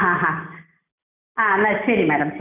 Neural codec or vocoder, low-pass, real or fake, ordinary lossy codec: none; 3.6 kHz; real; none